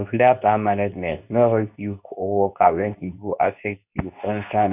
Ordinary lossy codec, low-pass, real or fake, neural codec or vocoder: none; 3.6 kHz; fake; codec, 24 kHz, 0.9 kbps, WavTokenizer, medium speech release version 2